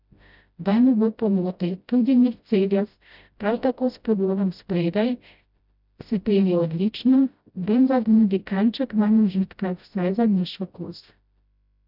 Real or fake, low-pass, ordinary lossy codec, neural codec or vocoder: fake; 5.4 kHz; none; codec, 16 kHz, 0.5 kbps, FreqCodec, smaller model